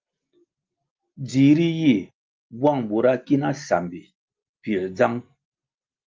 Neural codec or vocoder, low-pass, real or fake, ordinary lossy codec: none; 7.2 kHz; real; Opus, 24 kbps